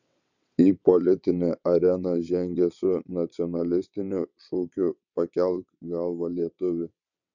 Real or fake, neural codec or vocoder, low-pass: fake; vocoder, 22.05 kHz, 80 mel bands, WaveNeXt; 7.2 kHz